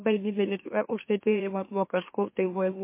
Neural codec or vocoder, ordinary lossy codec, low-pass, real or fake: autoencoder, 44.1 kHz, a latent of 192 numbers a frame, MeloTTS; MP3, 24 kbps; 3.6 kHz; fake